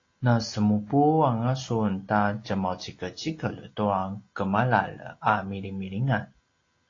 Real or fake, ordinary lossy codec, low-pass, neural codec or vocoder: real; AAC, 32 kbps; 7.2 kHz; none